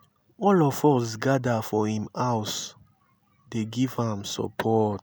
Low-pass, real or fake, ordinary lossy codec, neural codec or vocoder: none; real; none; none